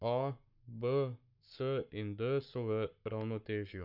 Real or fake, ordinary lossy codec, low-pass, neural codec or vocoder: fake; none; 5.4 kHz; codec, 44.1 kHz, 7.8 kbps, Pupu-Codec